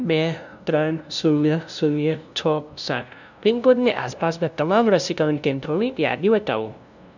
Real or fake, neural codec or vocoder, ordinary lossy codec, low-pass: fake; codec, 16 kHz, 0.5 kbps, FunCodec, trained on LibriTTS, 25 frames a second; none; 7.2 kHz